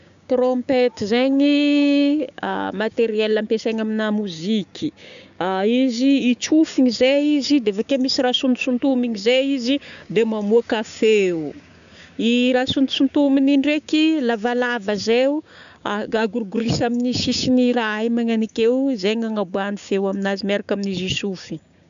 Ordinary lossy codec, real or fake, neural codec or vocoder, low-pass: AAC, 96 kbps; fake; codec, 16 kHz, 6 kbps, DAC; 7.2 kHz